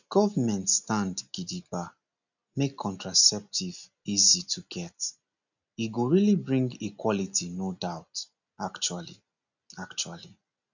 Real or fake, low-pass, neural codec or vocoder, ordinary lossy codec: real; 7.2 kHz; none; none